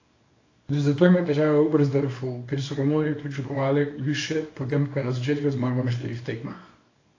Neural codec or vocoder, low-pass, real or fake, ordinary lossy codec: codec, 24 kHz, 0.9 kbps, WavTokenizer, small release; 7.2 kHz; fake; AAC, 32 kbps